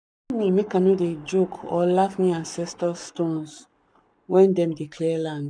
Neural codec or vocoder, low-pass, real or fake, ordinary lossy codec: codec, 44.1 kHz, 7.8 kbps, Pupu-Codec; 9.9 kHz; fake; none